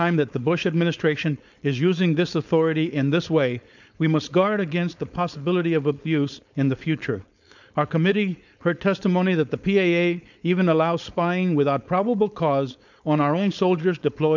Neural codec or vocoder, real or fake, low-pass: codec, 16 kHz, 4.8 kbps, FACodec; fake; 7.2 kHz